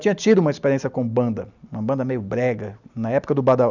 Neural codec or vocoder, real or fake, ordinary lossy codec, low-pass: none; real; none; 7.2 kHz